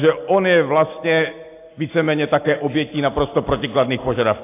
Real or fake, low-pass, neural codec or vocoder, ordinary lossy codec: real; 3.6 kHz; none; AAC, 24 kbps